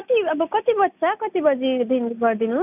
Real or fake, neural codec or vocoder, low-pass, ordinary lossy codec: real; none; 3.6 kHz; none